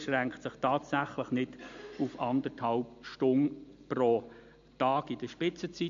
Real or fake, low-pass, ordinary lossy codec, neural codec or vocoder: real; 7.2 kHz; AAC, 64 kbps; none